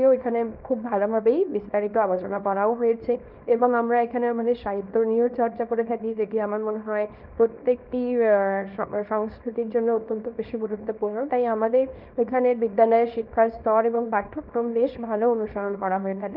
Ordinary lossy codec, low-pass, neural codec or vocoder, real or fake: Opus, 32 kbps; 5.4 kHz; codec, 24 kHz, 0.9 kbps, WavTokenizer, small release; fake